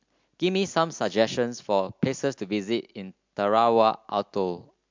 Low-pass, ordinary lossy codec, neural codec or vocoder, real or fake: 7.2 kHz; MP3, 64 kbps; none; real